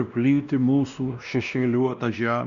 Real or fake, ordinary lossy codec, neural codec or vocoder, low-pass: fake; Opus, 64 kbps; codec, 16 kHz, 1 kbps, X-Codec, WavLM features, trained on Multilingual LibriSpeech; 7.2 kHz